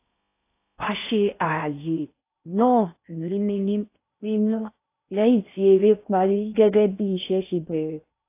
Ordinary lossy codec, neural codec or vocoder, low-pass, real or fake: AAC, 24 kbps; codec, 16 kHz in and 24 kHz out, 0.6 kbps, FocalCodec, streaming, 4096 codes; 3.6 kHz; fake